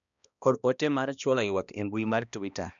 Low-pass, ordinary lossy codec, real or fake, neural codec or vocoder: 7.2 kHz; none; fake; codec, 16 kHz, 1 kbps, X-Codec, HuBERT features, trained on balanced general audio